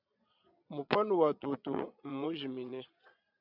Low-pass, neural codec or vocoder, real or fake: 5.4 kHz; vocoder, 22.05 kHz, 80 mel bands, Vocos; fake